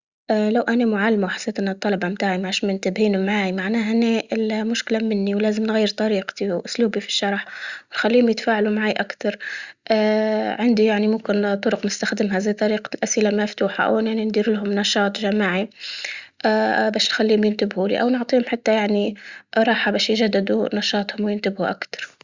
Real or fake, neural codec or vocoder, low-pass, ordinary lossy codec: real; none; 7.2 kHz; Opus, 64 kbps